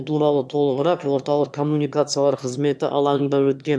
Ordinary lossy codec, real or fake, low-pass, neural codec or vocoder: none; fake; none; autoencoder, 22.05 kHz, a latent of 192 numbers a frame, VITS, trained on one speaker